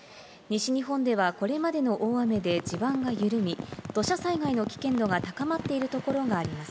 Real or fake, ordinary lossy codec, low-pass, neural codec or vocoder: real; none; none; none